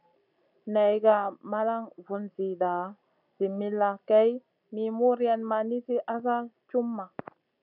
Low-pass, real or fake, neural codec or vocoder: 5.4 kHz; real; none